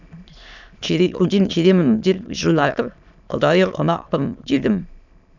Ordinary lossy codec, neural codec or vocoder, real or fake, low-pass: none; autoencoder, 22.05 kHz, a latent of 192 numbers a frame, VITS, trained on many speakers; fake; 7.2 kHz